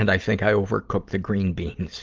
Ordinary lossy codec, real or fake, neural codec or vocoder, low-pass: Opus, 32 kbps; real; none; 7.2 kHz